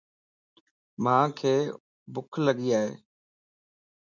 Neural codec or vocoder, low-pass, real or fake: none; 7.2 kHz; real